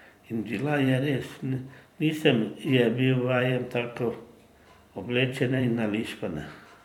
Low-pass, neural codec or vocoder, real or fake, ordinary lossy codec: 19.8 kHz; vocoder, 44.1 kHz, 128 mel bands every 256 samples, BigVGAN v2; fake; MP3, 96 kbps